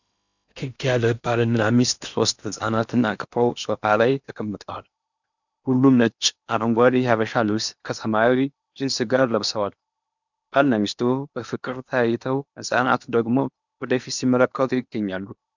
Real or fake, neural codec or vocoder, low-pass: fake; codec, 16 kHz in and 24 kHz out, 0.8 kbps, FocalCodec, streaming, 65536 codes; 7.2 kHz